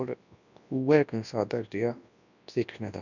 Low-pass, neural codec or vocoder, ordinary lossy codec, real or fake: 7.2 kHz; codec, 24 kHz, 0.9 kbps, WavTokenizer, large speech release; none; fake